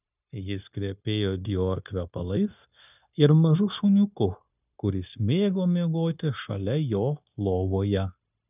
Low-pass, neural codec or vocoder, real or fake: 3.6 kHz; codec, 16 kHz, 0.9 kbps, LongCat-Audio-Codec; fake